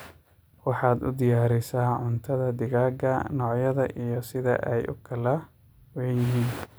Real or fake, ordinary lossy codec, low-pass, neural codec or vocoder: real; none; none; none